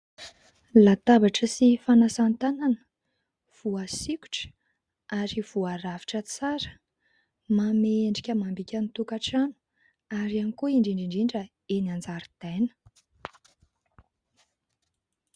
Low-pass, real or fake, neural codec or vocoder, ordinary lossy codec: 9.9 kHz; real; none; Opus, 64 kbps